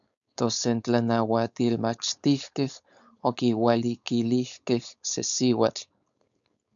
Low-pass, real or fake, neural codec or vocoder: 7.2 kHz; fake; codec, 16 kHz, 4.8 kbps, FACodec